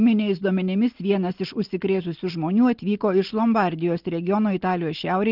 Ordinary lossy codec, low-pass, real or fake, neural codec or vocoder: Opus, 16 kbps; 5.4 kHz; real; none